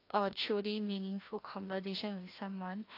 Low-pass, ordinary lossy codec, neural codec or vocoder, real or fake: 5.4 kHz; AAC, 32 kbps; codec, 16 kHz, 1 kbps, FreqCodec, larger model; fake